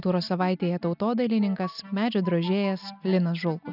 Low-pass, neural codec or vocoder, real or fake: 5.4 kHz; none; real